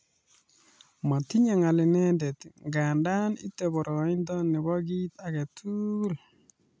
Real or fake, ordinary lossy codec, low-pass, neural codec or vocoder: real; none; none; none